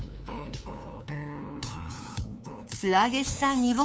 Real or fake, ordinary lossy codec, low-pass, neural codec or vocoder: fake; none; none; codec, 16 kHz, 2 kbps, FunCodec, trained on LibriTTS, 25 frames a second